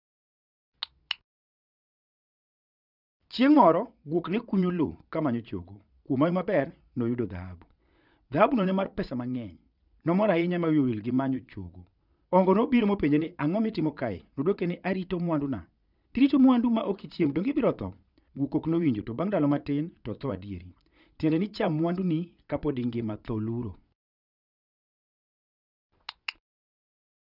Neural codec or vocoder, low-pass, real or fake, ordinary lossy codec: vocoder, 22.05 kHz, 80 mel bands, Vocos; 5.4 kHz; fake; none